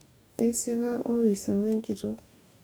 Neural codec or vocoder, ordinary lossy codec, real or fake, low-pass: codec, 44.1 kHz, 2.6 kbps, DAC; none; fake; none